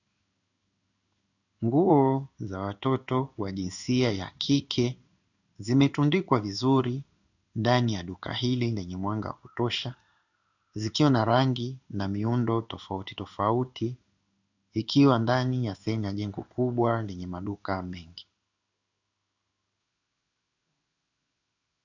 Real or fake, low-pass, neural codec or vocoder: fake; 7.2 kHz; codec, 16 kHz in and 24 kHz out, 1 kbps, XY-Tokenizer